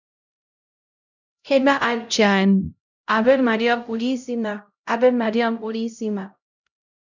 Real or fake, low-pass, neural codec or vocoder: fake; 7.2 kHz; codec, 16 kHz, 0.5 kbps, X-Codec, HuBERT features, trained on LibriSpeech